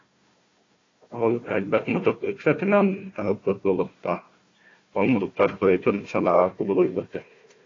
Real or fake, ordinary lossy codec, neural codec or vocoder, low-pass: fake; AAC, 32 kbps; codec, 16 kHz, 1 kbps, FunCodec, trained on Chinese and English, 50 frames a second; 7.2 kHz